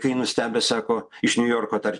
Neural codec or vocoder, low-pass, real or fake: none; 10.8 kHz; real